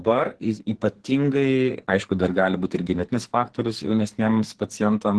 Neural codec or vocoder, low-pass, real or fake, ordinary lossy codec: codec, 44.1 kHz, 2.6 kbps, SNAC; 10.8 kHz; fake; Opus, 16 kbps